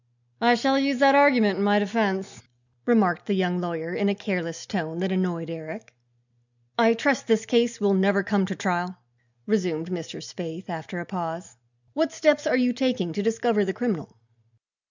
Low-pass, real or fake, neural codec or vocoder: 7.2 kHz; real; none